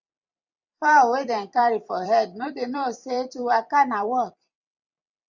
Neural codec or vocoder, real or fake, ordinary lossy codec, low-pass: vocoder, 44.1 kHz, 128 mel bands every 256 samples, BigVGAN v2; fake; Opus, 64 kbps; 7.2 kHz